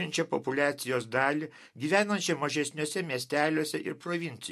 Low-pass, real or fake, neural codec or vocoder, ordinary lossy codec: 14.4 kHz; real; none; MP3, 64 kbps